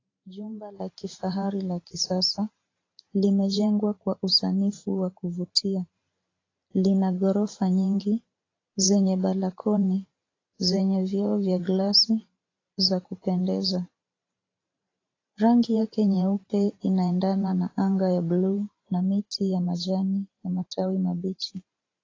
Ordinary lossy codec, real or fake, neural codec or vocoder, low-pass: AAC, 32 kbps; fake; vocoder, 44.1 kHz, 128 mel bands every 512 samples, BigVGAN v2; 7.2 kHz